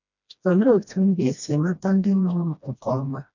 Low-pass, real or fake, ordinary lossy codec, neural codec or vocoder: 7.2 kHz; fake; none; codec, 16 kHz, 1 kbps, FreqCodec, smaller model